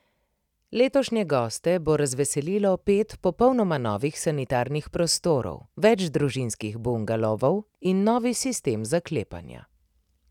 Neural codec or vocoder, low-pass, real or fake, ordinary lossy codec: none; 19.8 kHz; real; none